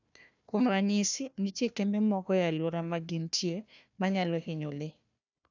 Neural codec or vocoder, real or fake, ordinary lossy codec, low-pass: codec, 16 kHz, 1 kbps, FunCodec, trained on Chinese and English, 50 frames a second; fake; none; 7.2 kHz